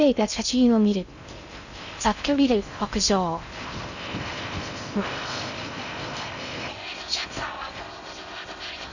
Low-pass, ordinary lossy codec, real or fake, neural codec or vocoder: 7.2 kHz; none; fake; codec, 16 kHz in and 24 kHz out, 0.6 kbps, FocalCodec, streaming, 2048 codes